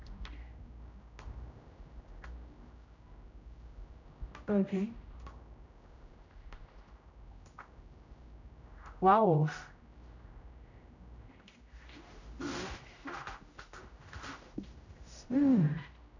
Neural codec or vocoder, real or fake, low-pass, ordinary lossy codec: codec, 16 kHz, 0.5 kbps, X-Codec, HuBERT features, trained on general audio; fake; 7.2 kHz; none